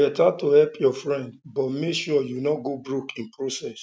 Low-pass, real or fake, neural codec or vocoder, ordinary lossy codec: none; real; none; none